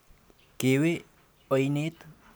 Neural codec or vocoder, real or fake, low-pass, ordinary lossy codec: none; real; none; none